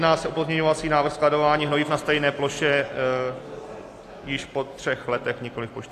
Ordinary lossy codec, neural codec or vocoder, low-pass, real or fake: AAC, 64 kbps; none; 14.4 kHz; real